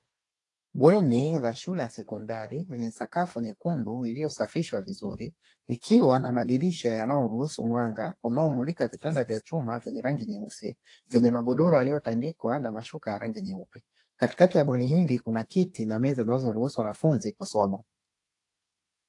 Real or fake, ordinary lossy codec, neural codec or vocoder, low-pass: fake; AAC, 48 kbps; codec, 24 kHz, 1 kbps, SNAC; 10.8 kHz